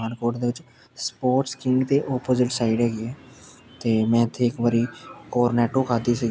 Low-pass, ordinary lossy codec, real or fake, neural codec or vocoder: none; none; real; none